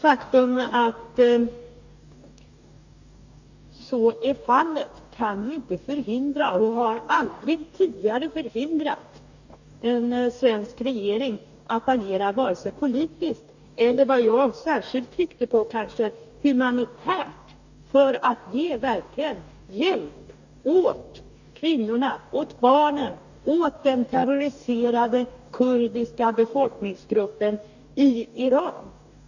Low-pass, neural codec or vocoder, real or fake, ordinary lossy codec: 7.2 kHz; codec, 44.1 kHz, 2.6 kbps, DAC; fake; none